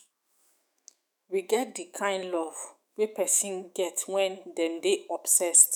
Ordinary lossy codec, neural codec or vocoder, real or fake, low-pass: none; autoencoder, 48 kHz, 128 numbers a frame, DAC-VAE, trained on Japanese speech; fake; none